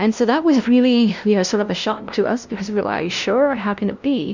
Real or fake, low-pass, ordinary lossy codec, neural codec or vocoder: fake; 7.2 kHz; Opus, 64 kbps; codec, 16 kHz, 0.5 kbps, FunCodec, trained on LibriTTS, 25 frames a second